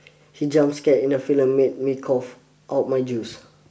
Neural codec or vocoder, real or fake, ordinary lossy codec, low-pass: none; real; none; none